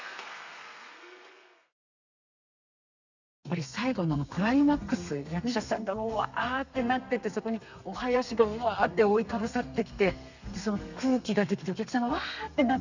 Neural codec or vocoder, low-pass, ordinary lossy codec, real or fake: codec, 32 kHz, 1.9 kbps, SNAC; 7.2 kHz; none; fake